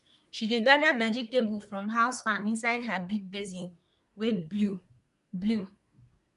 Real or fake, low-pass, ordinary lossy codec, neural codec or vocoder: fake; 10.8 kHz; none; codec, 24 kHz, 1 kbps, SNAC